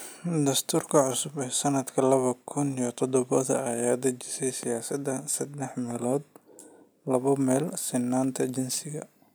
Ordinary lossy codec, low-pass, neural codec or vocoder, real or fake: none; none; none; real